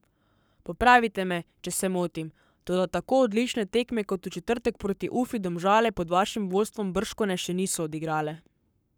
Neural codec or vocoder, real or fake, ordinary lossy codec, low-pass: codec, 44.1 kHz, 7.8 kbps, Pupu-Codec; fake; none; none